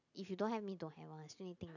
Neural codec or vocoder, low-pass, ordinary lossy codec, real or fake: none; 7.2 kHz; none; real